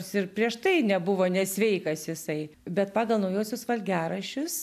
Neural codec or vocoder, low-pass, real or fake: none; 14.4 kHz; real